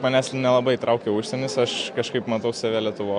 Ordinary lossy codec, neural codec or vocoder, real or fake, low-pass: MP3, 64 kbps; none; real; 9.9 kHz